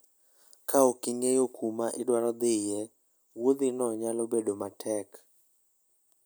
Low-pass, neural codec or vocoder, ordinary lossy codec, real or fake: none; none; none; real